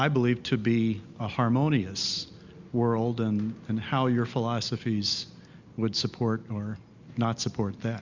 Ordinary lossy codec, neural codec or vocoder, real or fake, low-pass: Opus, 64 kbps; none; real; 7.2 kHz